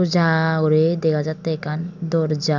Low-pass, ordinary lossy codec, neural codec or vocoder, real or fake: 7.2 kHz; none; none; real